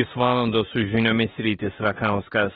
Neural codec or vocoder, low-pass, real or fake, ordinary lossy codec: autoencoder, 48 kHz, 32 numbers a frame, DAC-VAE, trained on Japanese speech; 19.8 kHz; fake; AAC, 16 kbps